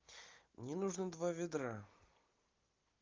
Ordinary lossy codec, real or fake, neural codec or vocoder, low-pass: Opus, 24 kbps; real; none; 7.2 kHz